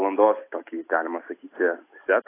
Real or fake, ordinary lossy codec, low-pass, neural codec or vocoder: real; AAC, 24 kbps; 3.6 kHz; none